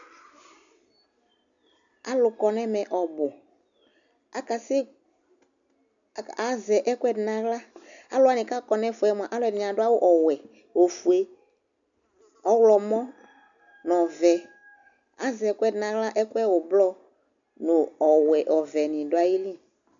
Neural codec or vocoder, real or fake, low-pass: none; real; 7.2 kHz